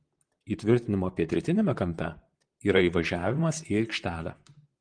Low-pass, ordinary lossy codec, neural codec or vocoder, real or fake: 9.9 kHz; Opus, 24 kbps; vocoder, 44.1 kHz, 128 mel bands, Pupu-Vocoder; fake